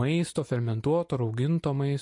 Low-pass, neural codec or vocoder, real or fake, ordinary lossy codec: 10.8 kHz; none; real; MP3, 48 kbps